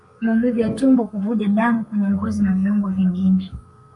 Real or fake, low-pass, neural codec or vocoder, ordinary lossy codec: fake; 10.8 kHz; codec, 32 kHz, 1.9 kbps, SNAC; MP3, 48 kbps